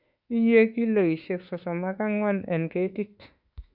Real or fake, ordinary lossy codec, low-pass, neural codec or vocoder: fake; Opus, 64 kbps; 5.4 kHz; autoencoder, 48 kHz, 32 numbers a frame, DAC-VAE, trained on Japanese speech